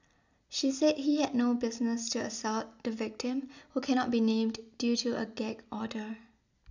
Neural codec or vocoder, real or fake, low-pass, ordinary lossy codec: none; real; 7.2 kHz; none